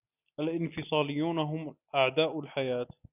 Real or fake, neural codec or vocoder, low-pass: real; none; 3.6 kHz